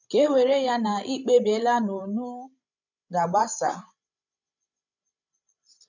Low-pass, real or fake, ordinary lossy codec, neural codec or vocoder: 7.2 kHz; fake; none; codec, 16 kHz, 16 kbps, FreqCodec, larger model